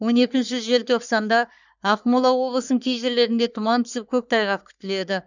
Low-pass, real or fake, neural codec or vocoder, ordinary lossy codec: 7.2 kHz; fake; codec, 44.1 kHz, 3.4 kbps, Pupu-Codec; none